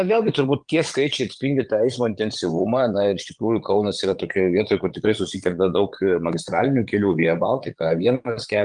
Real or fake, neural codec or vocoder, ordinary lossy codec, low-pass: fake; vocoder, 22.05 kHz, 80 mel bands, Vocos; Opus, 24 kbps; 9.9 kHz